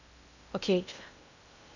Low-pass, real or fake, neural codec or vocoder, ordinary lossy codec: 7.2 kHz; fake; codec, 16 kHz in and 24 kHz out, 0.6 kbps, FocalCodec, streaming, 2048 codes; none